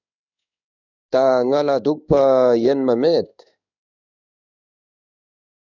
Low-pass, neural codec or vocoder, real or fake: 7.2 kHz; codec, 16 kHz in and 24 kHz out, 1 kbps, XY-Tokenizer; fake